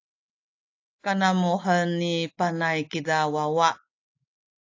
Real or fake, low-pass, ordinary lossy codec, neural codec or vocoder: real; 7.2 kHz; AAC, 48 kbps; none